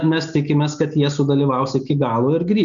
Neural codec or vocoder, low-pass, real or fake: none; 7.2 kHz; real